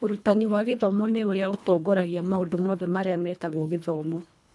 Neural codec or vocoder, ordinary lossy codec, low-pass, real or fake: codec, 24 kHz, 1.5 kbps, HILCodec; none; none; fake